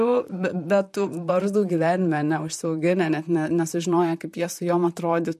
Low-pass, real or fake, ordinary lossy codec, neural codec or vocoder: 14.4 kHz; fake; MP3, 64 kbps; vocoder, 44.1 kHz, 128 mel bands, Pupu-Vocoder